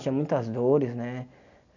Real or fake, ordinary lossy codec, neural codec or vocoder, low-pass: real; none; none; 7.2 kHz